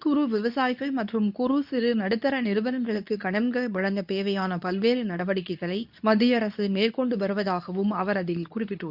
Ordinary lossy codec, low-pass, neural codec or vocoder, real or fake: none; 5.4 kHz; codec, 24 kHz, 0.9 kbps, WavTokenizer, medium speech release version 2; fake